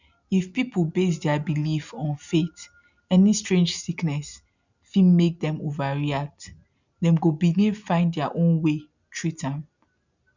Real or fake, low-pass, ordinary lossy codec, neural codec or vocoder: real; 7.2 kHz; none; none